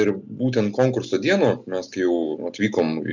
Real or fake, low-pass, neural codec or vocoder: real; 7.2 kHz; none